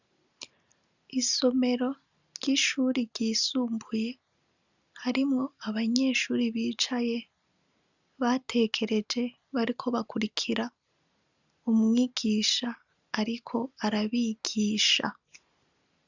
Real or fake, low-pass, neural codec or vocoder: real; 7.2 kHz; none